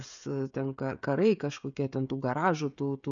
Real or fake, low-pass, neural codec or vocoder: fake; 7.2 kHz; codec, 16 kHz, 16 kbps, FreqCodec, smaller model